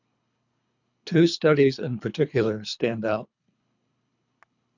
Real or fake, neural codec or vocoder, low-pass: fake; codec, 24 kHz, 3 kbps, HILCodec; 7.2 kHz